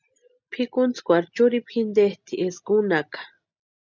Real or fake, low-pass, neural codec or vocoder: real; 7.2 kHz; none